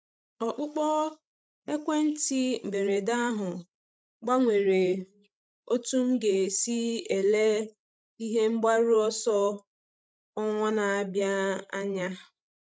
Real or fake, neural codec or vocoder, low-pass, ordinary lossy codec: fake; codec, 16 kHz, 16 kbps, FreqCodec, larger model; none; none